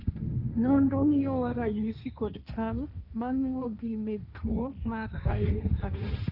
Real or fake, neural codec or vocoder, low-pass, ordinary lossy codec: fake; codec, 16 kHz, 1.1 kbps, Voila-Tokenizer; 5.4 kHz; none